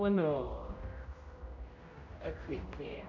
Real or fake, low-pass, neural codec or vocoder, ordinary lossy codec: fake; 7.2 kHz; codec, 16 kHz, 0.5 kbps, X-Codec, HuBERT features, trained on general audio; none